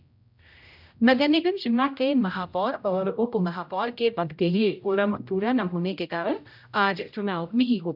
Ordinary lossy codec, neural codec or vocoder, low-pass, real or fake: none; codec, 16 kHz, 0.5 kbps, X-Codec, HuBERT features, trained on general audio; 5.4 kHz; fake